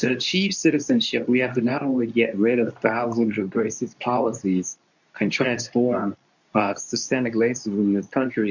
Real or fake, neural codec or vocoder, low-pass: fake; codec, 24 kHz, 0.9 kbps, WavTokenizer, medium speech release version 2; 7.2 kHz